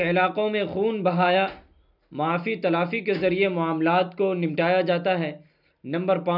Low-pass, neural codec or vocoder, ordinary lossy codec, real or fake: 5.4 kHz; none; none; real